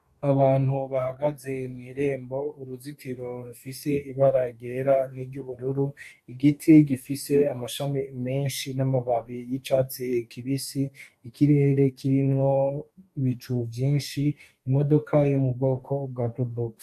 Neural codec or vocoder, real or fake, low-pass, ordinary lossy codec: codec, 44.1 kHz, 2.6 kbps, DAC; fake; 14.4 kHz; AAC, 96 kbps